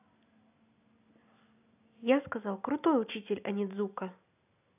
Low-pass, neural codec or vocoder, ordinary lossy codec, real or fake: 3.6 kHz; none; AAC, 32 kbps; real